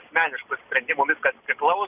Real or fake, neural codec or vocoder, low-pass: real; none; 3.6 kHz